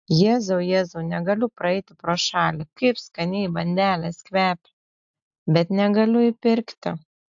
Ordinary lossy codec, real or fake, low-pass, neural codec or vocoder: AAC, 64 kbps; real; 7.2 kHz; none